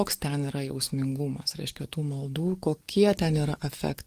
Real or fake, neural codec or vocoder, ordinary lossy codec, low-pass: fake; codec, 44.1 kHz, 7.8 kbps, DAC; Opus, 24 kbps; 14.4 kHz